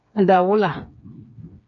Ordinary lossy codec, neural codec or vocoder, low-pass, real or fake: AAC, 64 kbps; codec, 16 kHz, 4 kbps, FreqCodec, smaller model; 7.2 kHz; fake